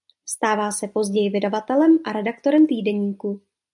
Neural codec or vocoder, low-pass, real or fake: none; 10.8 kHz; real